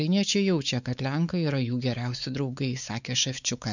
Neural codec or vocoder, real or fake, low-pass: codec, 16 kHz, 4 kbps, X-Codec, WavLM features, trained on Multilingual LibriSpeech; fake; 7.2 kHz